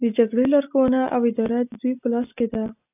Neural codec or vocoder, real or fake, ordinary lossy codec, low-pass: none; real; AAC, 32 kbps; 3.6 kHz